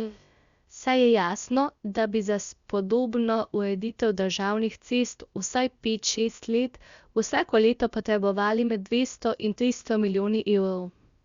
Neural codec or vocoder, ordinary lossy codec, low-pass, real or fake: codec, 16 kHz, about 1 kbps, DyCAST, with the encoder's durations; Opus, 64 kbps; 7.2 kHz; fake